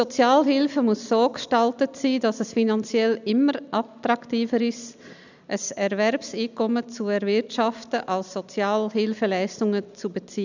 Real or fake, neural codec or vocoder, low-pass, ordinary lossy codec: real; none; 7.2 kHz; none